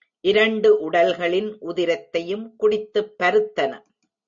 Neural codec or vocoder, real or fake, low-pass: none; real; 7.2 kHz